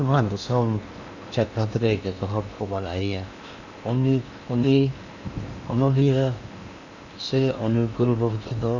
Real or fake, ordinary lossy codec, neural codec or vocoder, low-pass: fake; none; codec, 16 kHz in and 24 kHz out, 0.8 kbps, FocalCodec, streaming, 65536 codes; 7.2 kHz